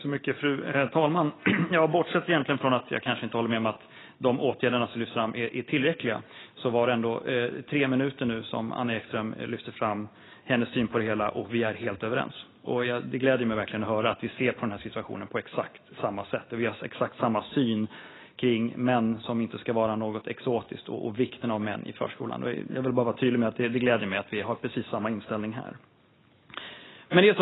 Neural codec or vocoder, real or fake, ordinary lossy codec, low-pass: none; real; AAC, 16 kbps; 7.2 kHz